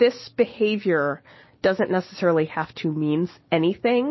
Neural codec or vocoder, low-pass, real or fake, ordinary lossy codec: none; 7.2 kHz; real; MP3, 24 kbps